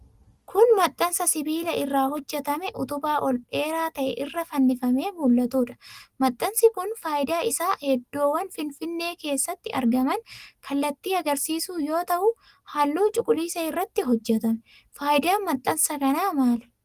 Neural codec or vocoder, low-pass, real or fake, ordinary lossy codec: none; 14.4 kHz; real; Opus, 24 kbps